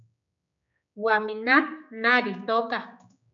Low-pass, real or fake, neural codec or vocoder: 7.2 kHz; fake; codec, 16 kHz, 4 kbps, X-Codec, HuBERT features, trained on general audio